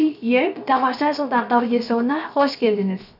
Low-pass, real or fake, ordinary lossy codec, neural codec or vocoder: 5.4 kHz; fake; none; codec, 16 kHz, 0.7 kbps, FocalCodec